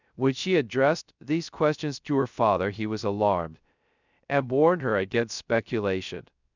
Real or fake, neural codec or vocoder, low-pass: fake; codec, 16 kHz, 0.3 kbps, FocalCodec; 7.2 kHz